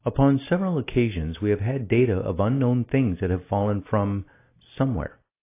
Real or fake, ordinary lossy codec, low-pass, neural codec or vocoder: real; AAC, 24 kbps; 3.6 kHz; none